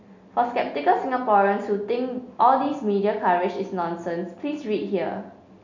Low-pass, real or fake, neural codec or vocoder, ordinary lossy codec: 7.2 kHz; real; none; none